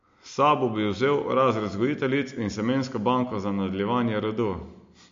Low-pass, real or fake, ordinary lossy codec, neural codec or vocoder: 7.2 kHz; real; MP3, 48 kbps; none